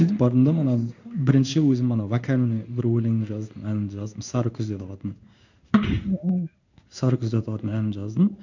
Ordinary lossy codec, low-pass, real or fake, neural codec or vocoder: none; 7.2 kHz; fake; codec, 16 kHz in and 24 kHz out, 1 kbps, XY-Tokenizer